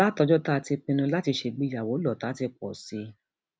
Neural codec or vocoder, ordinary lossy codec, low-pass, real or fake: none; none; none; real